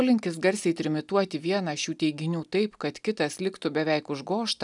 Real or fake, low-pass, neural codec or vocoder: real; 10.8 kHz; none